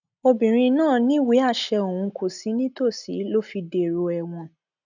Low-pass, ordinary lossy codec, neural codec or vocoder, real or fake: 7.2 kHz; none; none; real